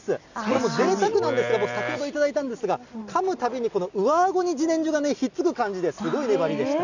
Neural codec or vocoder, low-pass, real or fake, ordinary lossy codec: none; 7.2 kHz; real; none